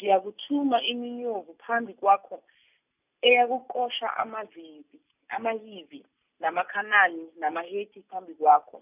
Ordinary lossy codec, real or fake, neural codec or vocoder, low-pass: none; real; none; 3.6 kHz